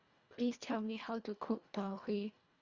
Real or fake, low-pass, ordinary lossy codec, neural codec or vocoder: fake; 7.2 kHz; Opus, 64 kbps; codec, 24 kHz, 1.5 kbps, HILCodec